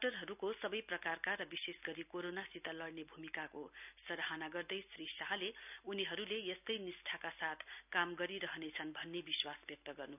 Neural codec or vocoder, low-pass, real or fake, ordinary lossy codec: none; 3.6 kHz; real; none